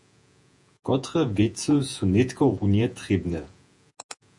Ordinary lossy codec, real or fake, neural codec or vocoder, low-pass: AAC, 64 kbps; fake; vocoder, 48 kHz, 128 mel bands, Vocos; 10.8 kHz